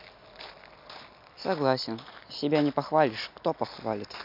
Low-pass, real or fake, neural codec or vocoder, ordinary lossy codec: 5.4 kHz; real; none; MP3, 48 kbps